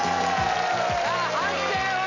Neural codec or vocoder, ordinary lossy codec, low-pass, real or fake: none; none; 7.2 kHz; real